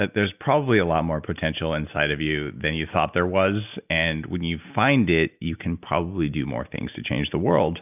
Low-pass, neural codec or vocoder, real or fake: 3.6 kHz; none; real